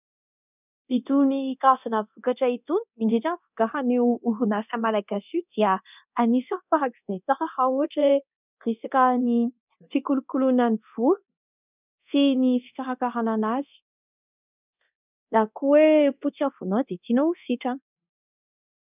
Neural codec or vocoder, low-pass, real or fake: codec, 24 kHz, 0.9 kbps, DualCodec; 3.6 kHz; fake